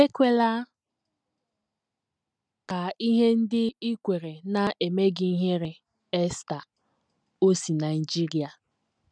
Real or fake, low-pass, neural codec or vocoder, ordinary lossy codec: real; 9.9 kHz; none; none